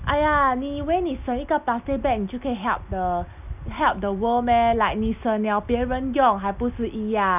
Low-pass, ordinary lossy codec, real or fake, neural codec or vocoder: 3.6 kHz; none; real; none